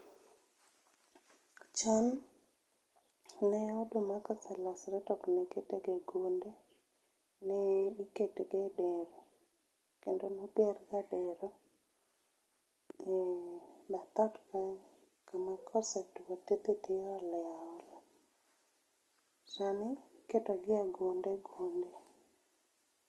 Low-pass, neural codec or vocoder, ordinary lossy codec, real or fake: 19.8 kHz; none; Opus, 24 kbps; real